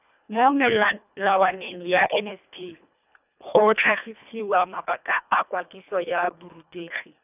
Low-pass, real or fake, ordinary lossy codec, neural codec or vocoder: 3.6 kHz; fake; none; codec, 24 kHz, 1.5 kbps, HILCodec